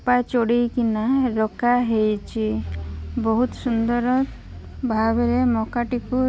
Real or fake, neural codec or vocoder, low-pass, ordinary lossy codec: real; none; none; none